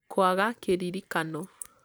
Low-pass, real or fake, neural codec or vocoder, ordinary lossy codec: none; real; none; none